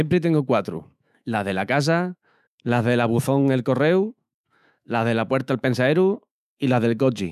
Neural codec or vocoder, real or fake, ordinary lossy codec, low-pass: autoencoder, 48 kHz, 128 numbers a frame, DAC-VAE, trained on Japanese speech; fake; none; 14.4 kHz